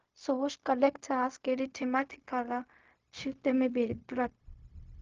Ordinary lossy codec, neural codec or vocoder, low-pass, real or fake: Opus, 24 kbps; codec, 16 kHz, 0.4 kbps, LongCat-Audio-Codec; 7.2 kHz; fake